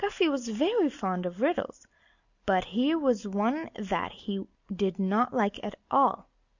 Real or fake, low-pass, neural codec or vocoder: real; 7.2 kHz; none